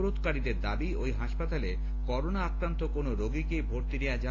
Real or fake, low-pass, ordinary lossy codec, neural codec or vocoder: real; 7.2 kHz; AAC, 32 kbps; none